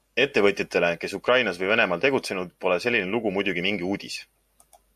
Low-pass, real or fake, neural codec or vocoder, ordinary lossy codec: 14.4 kHz; real; none; AAC, 96 kbps